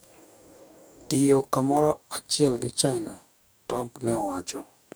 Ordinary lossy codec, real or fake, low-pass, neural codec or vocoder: none; fake; none; codec, 44.1 kHz, 2.6 kbps, DAC